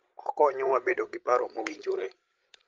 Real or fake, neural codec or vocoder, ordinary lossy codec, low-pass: fake; codec, 16 kHz, 16 kbps, FreqCodec, larger model; Opus, 32 kbps; 7.2 kHz